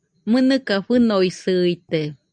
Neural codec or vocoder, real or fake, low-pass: none; real; 9.9 kHz